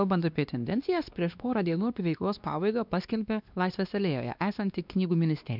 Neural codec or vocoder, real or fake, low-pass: codec, 16 kHz, 2 kbps, X-Codec, WavLM features, trained on Multilingual LibriSpeech; fake; 5.4 kHz